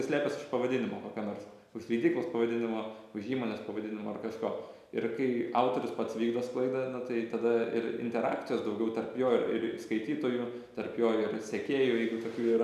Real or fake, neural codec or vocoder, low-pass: real; none; 14.4 kHz